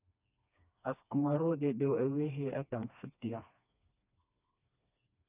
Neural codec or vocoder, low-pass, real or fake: codec, 16 kHz, 2 kbps, FreqCodec, smaller model; 3.6 kHz; fake